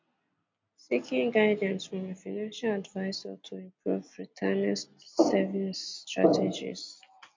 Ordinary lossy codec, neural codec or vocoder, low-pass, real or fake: MP3, 48 kbps; none; 7.2 kHz; real